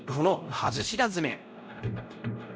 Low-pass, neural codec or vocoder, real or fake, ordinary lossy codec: none; codec, 16 kHz, 0.5 kbps, X-Codec, WavLM features, trained on Multilingual LibriSpeech; fake; none